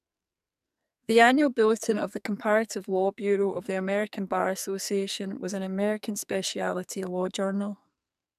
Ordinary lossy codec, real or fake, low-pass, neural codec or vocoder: none; fake; 14.4 kHz; codec, 44.1 kHz, 2.6 kbps, SNAC